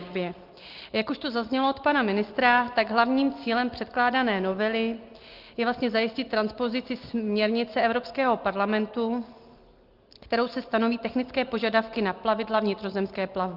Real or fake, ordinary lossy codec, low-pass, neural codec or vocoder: real; Opus, 32 kbps; 5.4 kHz; none